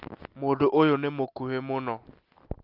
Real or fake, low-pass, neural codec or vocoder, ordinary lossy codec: real; 5.4 kHz; none; Opus, 32 kbps